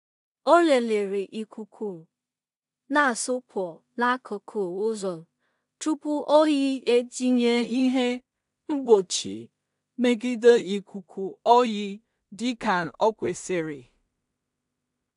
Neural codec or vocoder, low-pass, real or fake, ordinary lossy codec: codec, 16 kHz in and 24 kHz out, 0.4 kbps, LongCat-Audio-Codec, two codebook decoder; 10.8 kHz; fake; AAC, 96 kbps